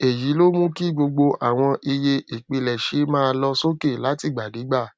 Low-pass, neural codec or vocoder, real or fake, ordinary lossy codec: none; none; real; none